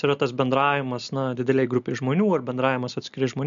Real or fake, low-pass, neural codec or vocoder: real; 7.2 kHz; none